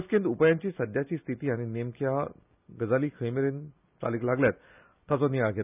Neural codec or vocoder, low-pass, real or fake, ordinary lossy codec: none; 3.6 kHz; real; none